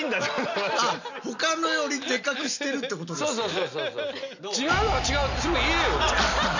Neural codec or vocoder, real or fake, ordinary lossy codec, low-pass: none; real; none; 7.2 kHz